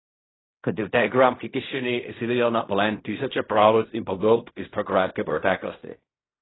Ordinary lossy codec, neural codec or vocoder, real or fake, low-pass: AAC, 16 kbps; codec, 16 kHz in and 24 kHz out, 0.4 kbps, LongCat-Audio-Codec, fine tuned four codebook decoder; fake; 7.2 kHz